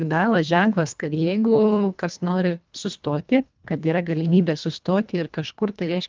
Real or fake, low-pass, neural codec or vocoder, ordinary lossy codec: fake; 7.2 kHz; codec, 24 kHz, 1.5 kbps, HILCodec; Opus, 24 kbps